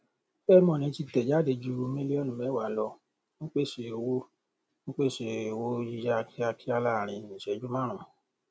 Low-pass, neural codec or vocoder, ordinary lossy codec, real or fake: none; none; none; real